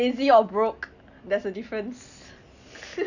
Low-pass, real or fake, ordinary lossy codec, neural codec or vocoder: 7.2 kHz; real; none; none